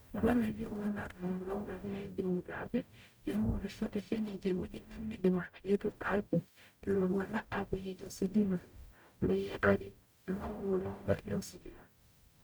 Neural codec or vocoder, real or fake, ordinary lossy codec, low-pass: codec, 44.1 kHz, 0.9 kbps, DAC; fake; none; none